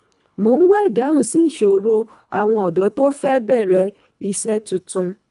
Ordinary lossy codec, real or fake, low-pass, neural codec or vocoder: none; fake; 10.8 kHz; codec, 24 kHz, 1.5 kbps, HILCodec